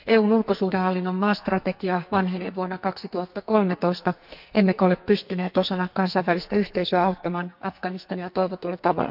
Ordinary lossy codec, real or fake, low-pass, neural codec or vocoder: none; fake; 5.4 kHz; codec, 44.1 kHz, 2.6 kbps, SNAC